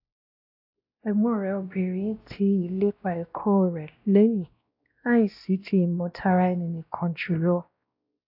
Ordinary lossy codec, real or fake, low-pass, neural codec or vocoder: AAC, 48 kbps; fake; 5.4 kHz; codec, 16 kHz, 1 kbps, X-Codec, WavLM features, trained on Multilingual LibriSpeech